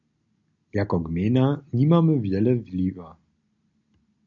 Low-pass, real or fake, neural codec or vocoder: 7.2 kHz; real; none